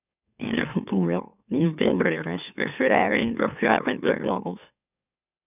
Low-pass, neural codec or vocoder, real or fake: 3.6 kHz; autoencoder, 44.1 kHz, a latent of 192 numbers a frame, MeloTTS; fake